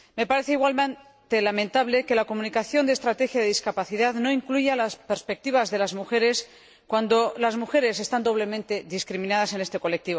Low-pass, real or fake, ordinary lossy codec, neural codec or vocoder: none; real; none; none